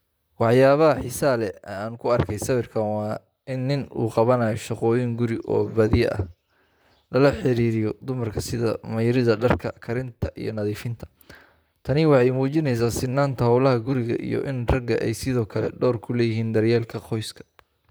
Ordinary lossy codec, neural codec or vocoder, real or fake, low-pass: none; vocoder, 44.1 kHz, 128 mel bands, Pupu-Vocoder; fake; none